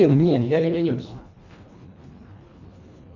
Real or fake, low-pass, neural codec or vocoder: fake; 7.2 kHz; codec, 24 kHz, 1.5 kbps, HILCodec